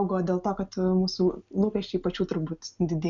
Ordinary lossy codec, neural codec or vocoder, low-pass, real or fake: MP3, 96 kbps; none; 7.2 kHz; real